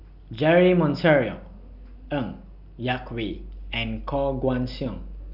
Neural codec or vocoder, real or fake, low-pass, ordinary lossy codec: none; real; 5.4 kHz; none